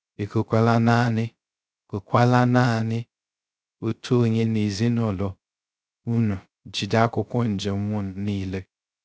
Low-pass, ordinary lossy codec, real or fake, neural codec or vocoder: none; none; fake; codec, 16 kHz, 0.3 kbps, FocalCodec